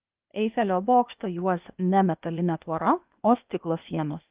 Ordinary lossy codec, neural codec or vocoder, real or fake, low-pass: Opus, 24 kbps; codec, 16 kHz, 0.8 kbps, ZipCodec; fake; 3.6 kHz